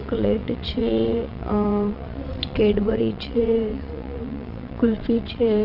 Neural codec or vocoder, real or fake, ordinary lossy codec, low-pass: vocoder, 22.05 kHz, 80 mel bands, WaveNeXt; fake; none; 5.4 kHz